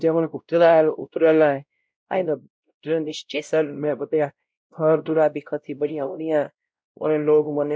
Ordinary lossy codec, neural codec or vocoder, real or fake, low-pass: none; codec, 16 kHz, 0.5 kbps, X-Codec, WavLM features, trained on Multilingual LibriSpeech; fake; none